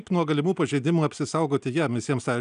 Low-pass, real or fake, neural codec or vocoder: 9.9 kHz; real; none